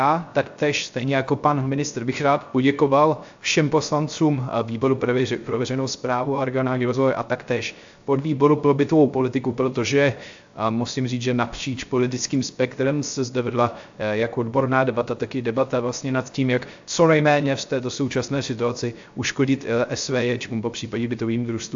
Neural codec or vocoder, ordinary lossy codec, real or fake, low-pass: codec, 16 kHz, 0.3 kbps, FocalCodec; AAC, 64 kbps; fake; 7.2 kHz